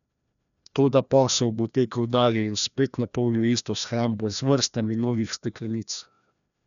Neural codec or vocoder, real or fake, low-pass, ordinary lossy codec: codec, 16 kHz, 1 kbps, FreqCodec, larger model; fake; 7.2 kHz; none